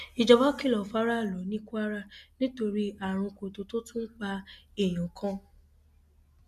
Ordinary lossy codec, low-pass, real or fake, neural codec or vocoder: none; 14.4 kHz; real; none